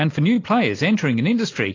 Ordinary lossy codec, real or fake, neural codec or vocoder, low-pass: AAC, 32 kbps; real; none; 7.2 kHz